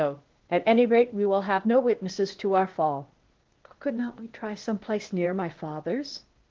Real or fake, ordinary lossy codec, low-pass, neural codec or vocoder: fake; Opus, 16 kbps; 7.2 kHz; codec, 16 kHz, 0.8 kbps, ZipCodec